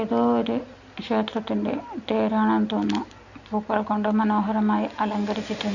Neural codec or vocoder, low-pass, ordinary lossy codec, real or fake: none; 7.2 kHz; none; real